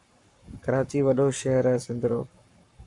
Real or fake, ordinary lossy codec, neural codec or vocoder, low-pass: fake; MP3, 96 kbps; codec, 44.1 kHz, 7.8 kbps, Pupu-Codec; 10.8 kHz